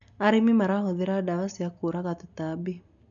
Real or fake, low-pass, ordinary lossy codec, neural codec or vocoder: real; 7.2 kHz; none; none